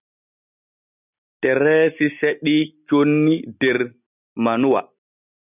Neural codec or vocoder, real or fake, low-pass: none; real; 3.6 kHz